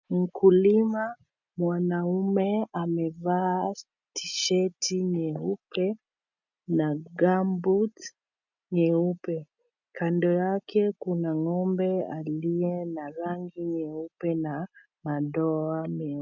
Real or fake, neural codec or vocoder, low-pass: real; none; 7.2 kHz